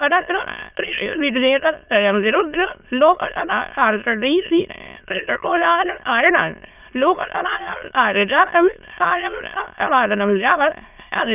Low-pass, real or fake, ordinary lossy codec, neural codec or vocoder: 3.6 kHz; fake; none; autoencoder, 22.05 kHz, a latent of 192 numbers a frame, VITS, trained on many speakers